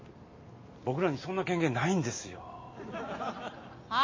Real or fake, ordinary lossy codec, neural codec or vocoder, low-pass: real; MP3, 32 kbps; none; 7.2 kHz